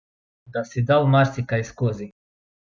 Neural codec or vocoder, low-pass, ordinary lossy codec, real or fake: none; none; none; real